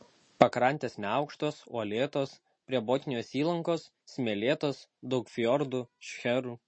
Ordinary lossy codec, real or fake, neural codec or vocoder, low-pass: MP3, 32 kbps; real; none; 9.9 kHz